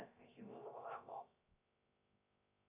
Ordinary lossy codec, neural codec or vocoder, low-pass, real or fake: MP3, 32 kbps; codec, 16 kHz, 0.3 kbps, FocalCodec; 3.6 kHz; fake